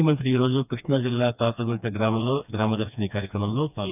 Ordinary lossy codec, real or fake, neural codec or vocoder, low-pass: none; fake; codec, 16 kHz, 2 kbps, FreqCodec, smaller model; 3.6 kHz